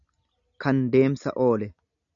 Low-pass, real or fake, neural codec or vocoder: 7.2 kHz; real; none